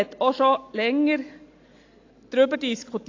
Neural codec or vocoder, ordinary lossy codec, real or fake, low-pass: none; AAC, 48 kbps; real; 7.2 kHz